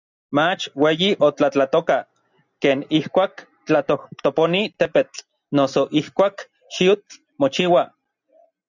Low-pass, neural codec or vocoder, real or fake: 7.2 kHz; none; real